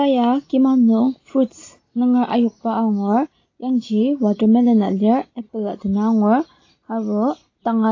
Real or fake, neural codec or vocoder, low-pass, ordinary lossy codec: real; none; 7.2 kHz; AAC, 32 kbps